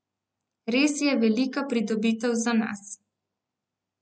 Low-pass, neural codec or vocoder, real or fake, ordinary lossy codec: none; none; real; none